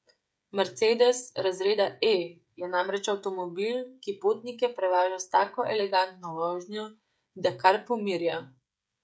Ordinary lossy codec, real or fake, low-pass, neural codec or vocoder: none; fake; none; codec, 16 kHz, 16 kbps, FreqCodec, smaller model